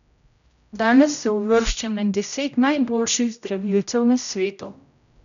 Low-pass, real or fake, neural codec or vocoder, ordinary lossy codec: 7.2 kHz; fake; codec, 16 kHz, 0.5 kbps, X-Codec, HuBERT features, trained on general audio; none